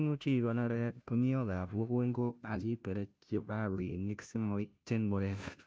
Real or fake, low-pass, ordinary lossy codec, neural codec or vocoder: fake; none; none; codec, 16 kHz, 0.5 kbps, FunCodec, trained on Chinese and English, 25 frames a second